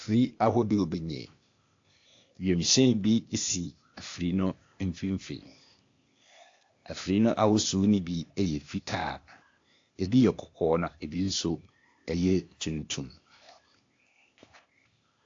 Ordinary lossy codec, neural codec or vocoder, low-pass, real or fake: AAC, 48 kbps; codec, 16 kHz, 0.8 kbps, ZipCodec; 7.2 kHz; fake